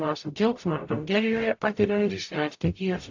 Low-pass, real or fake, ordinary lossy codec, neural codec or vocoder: 7.2 kHz; fake; AAC, 48 kbps; codec, 44.1 kHz, 0.9 kbps, DAC